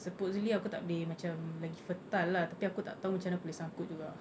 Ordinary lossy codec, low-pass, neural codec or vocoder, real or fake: none; none; none; real